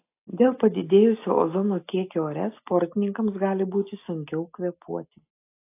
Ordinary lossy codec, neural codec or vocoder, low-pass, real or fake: AAC, 24 kbps; none; 3.6 kHz; real